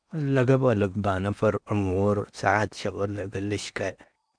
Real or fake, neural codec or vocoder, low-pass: fake; codec, 16 kHz in and 24 kHz out, 0.8 kbps, FocalCodec, streaming, 65536 codes; 9.9 kHz